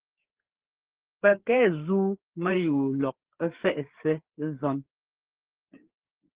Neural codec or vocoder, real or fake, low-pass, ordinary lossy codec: codec, 16 kHz, 4 kbps, FreqCodec, larger model; fake; 3.6 kHz; Opus, 16 kbps